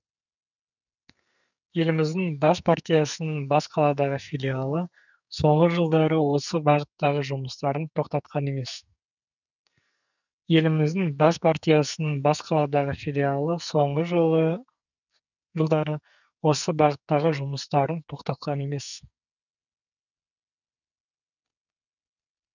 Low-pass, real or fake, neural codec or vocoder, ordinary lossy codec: 7.2 kHz; fake; codec, 44.1 kHz, 2.6 kbps, SNAC; none